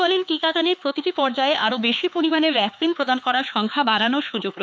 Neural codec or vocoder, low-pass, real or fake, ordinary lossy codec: codec, 16 kHz, 4 kbps, X-Codec, HuBERT features, trained on LibriSpeech; none; fake; none